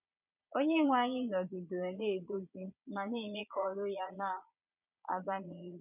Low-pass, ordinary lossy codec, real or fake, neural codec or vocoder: 3.6 kHz; none; fake; vocoder, 22.05 kHz, 80 mel bands, Vocos